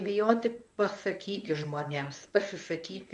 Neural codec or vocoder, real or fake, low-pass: codec, 24 kHz, 0.9 kbps, WavTokenizer, medium speech release version 1; fake; 10.8 kHz